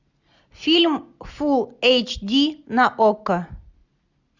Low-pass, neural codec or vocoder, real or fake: 7.2 kHz; none; real